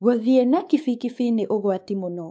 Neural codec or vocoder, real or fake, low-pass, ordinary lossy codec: codec, 16 kHz, 4 kbps, X-Codec, WavLM features, trained on Multilingual LibriSpeech; fake; none; none